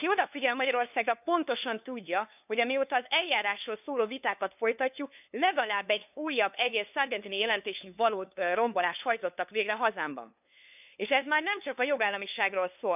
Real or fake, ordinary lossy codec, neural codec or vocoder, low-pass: fake; none; codec, 16 kHz, 2 kbps, FunCodec, trained on LibriTTS, 25 frames a second; 3.6 kHz